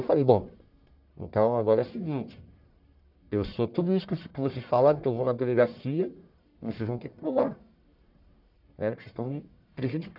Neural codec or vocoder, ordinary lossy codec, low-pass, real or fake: codec, 44.1 kHz, 1.7 kbps, Pupu-Codec; none; 5.4 kHz; fake